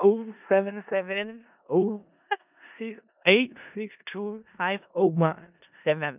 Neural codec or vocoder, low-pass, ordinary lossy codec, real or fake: codec, 16 kHz in and 24 kHz out, 0.4 kbps, LongCat-Audio-Codec, four codebook decoder; 3.6 kHz; none; fake